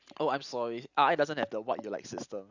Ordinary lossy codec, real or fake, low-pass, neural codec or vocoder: Opus, 64 kbps; real; 7.2 kHz; none